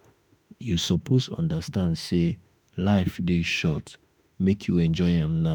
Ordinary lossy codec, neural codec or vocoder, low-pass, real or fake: none; autoencoder, 48 kHz, 32 numbers a frame, DAC-VAE, trained on Japanese speech; none; fake